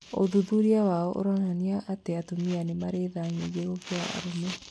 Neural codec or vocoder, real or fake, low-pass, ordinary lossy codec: none; real; none; none